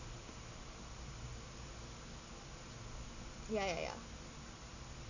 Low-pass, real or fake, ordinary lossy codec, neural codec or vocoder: 7.2 kHz; real; none; none